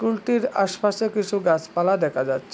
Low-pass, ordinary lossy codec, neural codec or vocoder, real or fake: none; none; none; real